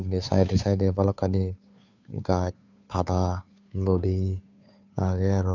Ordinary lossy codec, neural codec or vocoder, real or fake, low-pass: none; codec, 16 kHz, 2 kbps, FunCodec, trained on Chinese and English, 25 frames a second; fake; 7.2 kHz